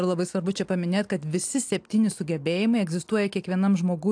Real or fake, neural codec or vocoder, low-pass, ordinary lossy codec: real; none; 9.9 kHz; AAC, 64 kbps